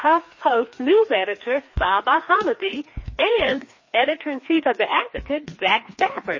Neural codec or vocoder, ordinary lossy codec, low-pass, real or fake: codec, 16 kHz, 2 kbps, FreqCodec, larger model; MP3, 32 kbps; 7.2 kHz; fake